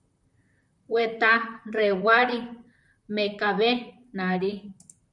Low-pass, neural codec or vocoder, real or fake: 10.8 kHz; vocoder, 44.1 kHz, 128 mel bands, Pupu-Vocoder; fake